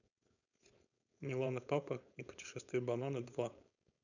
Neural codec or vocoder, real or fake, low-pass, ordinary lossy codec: codec, 16 kHz, 4.8 kbps, FACodec; fake; 7.2 kHz; none